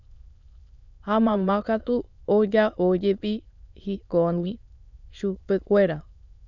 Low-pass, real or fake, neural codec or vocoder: 7.2 kHz; fake; autoencoder, 22.05 kHz, a latent of 192 numbers a frame, VITS, trained on many speakers